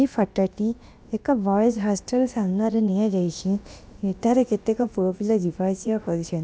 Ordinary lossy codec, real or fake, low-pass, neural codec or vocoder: none; fake; none; codec, 16 kHz, about 1 kbps, DyCAST, with the encoder's durations